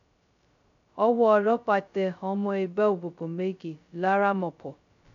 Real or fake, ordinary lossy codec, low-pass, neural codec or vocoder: fake; none; 7.2 kHz; codec, 16 kHz, 0.2 kbps, FocalCodec